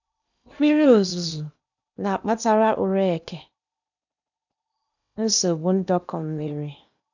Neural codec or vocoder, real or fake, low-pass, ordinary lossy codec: codec, 16 kHz in and 24 kHz out, 0.8 kbps, FocalCodec, streaming, 65536 codes; fake; 7.2 kHz; none